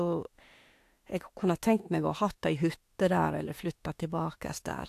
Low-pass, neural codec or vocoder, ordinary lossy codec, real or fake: 14.4 kHz; autoencoder, 48 kHz, 32 numbers a frame, DAC-VAE, trained on Japanese speech; AAC, 64 kbps; fake